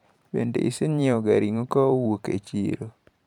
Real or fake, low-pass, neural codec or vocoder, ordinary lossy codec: fake; 19.8 kHz; vocoder, 44.1 kHz, 128 mel bands every 512 samples, BigVGAN v2; none